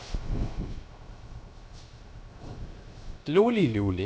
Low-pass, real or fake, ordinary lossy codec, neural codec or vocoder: none; fake; none; codec, 16 kHz, 0.3 kbps, FocalCodec